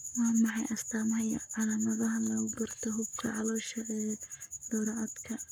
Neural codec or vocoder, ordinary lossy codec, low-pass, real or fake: codec, 44.1 kHz, 7.8 kbps, Pupu-Codec; none; none; fake